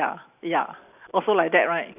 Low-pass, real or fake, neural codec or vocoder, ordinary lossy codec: 3.6 kHz; real; none; none